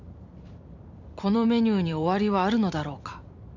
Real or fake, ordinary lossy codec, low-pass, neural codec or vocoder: fake; none; 7.2 kHz; vocoder, 44.1 kHz, 128 mel bands every 256 samples, BigVGAN v2